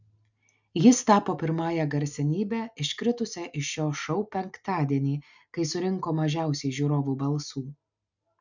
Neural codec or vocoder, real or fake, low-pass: none; real; 7.2 kHz